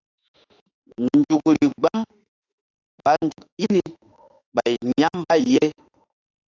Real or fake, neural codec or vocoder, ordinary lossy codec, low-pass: fake; autoencoder, 48 kHz, 32 numbers a frame, DAC-VAE, trained on Japanese speech; AAC, 48 kbps; 7.2 kHz